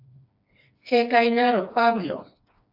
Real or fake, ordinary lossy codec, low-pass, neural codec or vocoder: fake; AAC, 48 kbps; 5.4 kHz; codec, 16 kHz, 2 kbps, FreqCodec, smaller model